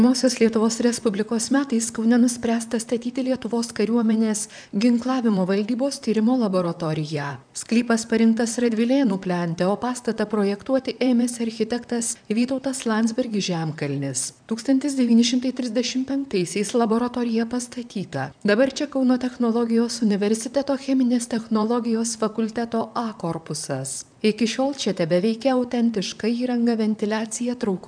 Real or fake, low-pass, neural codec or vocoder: fake; 9.9 kHz; vocoder, 44.1 kHz, 128 mel bands, Pupu-Vocoder